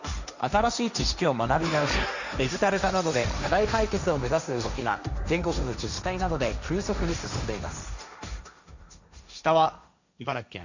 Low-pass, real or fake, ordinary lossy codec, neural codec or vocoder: 7.2 kHz; fake; none; codec, 16 kHz, 1.1 kbps, Voila-Tokenizer